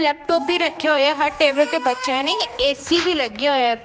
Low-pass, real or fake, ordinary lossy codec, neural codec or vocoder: none; fake; none; codec, 16 kHz, 2 kbps, X-Codec, HuBERT features, trained on general audio